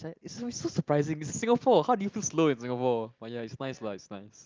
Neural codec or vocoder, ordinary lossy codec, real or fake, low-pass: none; Opus, 32 kbps; real; 7.2 kHz